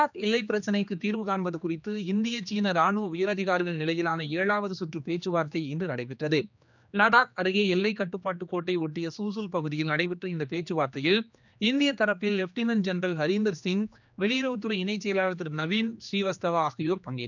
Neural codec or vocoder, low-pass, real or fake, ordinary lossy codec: codec, 16 kHz, 2 kbps, X-Codec, HuBERT features, trained on general audio; 7.2 kHz; fake; none